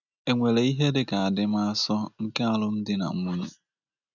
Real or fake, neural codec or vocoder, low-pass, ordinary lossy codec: real; none; 7.2 kHz; none